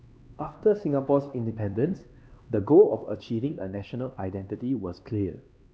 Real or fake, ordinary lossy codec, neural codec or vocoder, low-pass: fake; none; codec, 16 kHz, 2 kbps, X-Codec, HuBERT features, trained on LibriSpeech; none